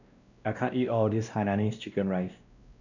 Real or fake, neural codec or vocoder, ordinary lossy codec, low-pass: fake; codec, 16 kHz, 1 kbps, X-Codec, WavLM features, trained on Multilingual LibriSpeech; none; 7.2 kHz